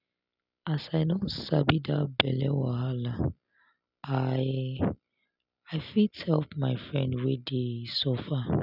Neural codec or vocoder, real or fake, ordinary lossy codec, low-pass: none; real; none; 5.4 kHz